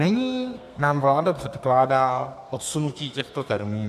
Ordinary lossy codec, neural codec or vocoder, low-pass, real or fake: AAC, 96 kbps; codec, 32 kHz, 1.9 kbps, SNAC; 14.4 kHz; fake